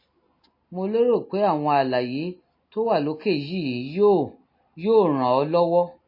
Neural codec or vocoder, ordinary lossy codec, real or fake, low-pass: none; MP3, 24 kbps; real; 5.4 kHz